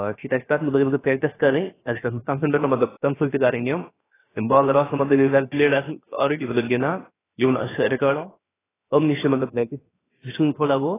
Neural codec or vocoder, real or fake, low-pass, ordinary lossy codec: codec, 16 kHz, 0.7 kbps, FocalCodec; fake; 3.6 kHz; AAC, 16 kbps